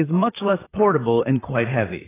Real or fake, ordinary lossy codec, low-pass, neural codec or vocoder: fake; AAC, 16 kbps; 3.6 kHz; vocoder, 44.1 kHz, 128 mel bands, Pupu-Vocoder